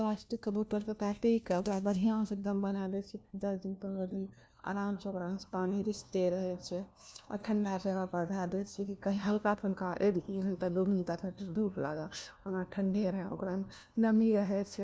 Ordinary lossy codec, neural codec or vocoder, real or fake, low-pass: none; codec, 16 kHz, 1 kbps, FunCodec, trained on LibriTTS, 50 frames a second; fake; none